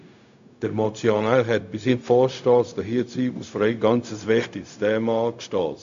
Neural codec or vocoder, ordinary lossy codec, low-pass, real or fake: codec, 16 kHz, 0.4 kbps, LongCat-Audio-Codec; AAC, 64 kbps; 7.2 kHz; fake